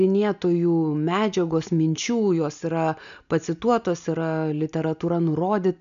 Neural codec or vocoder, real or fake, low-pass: none; real; 7.2 kHz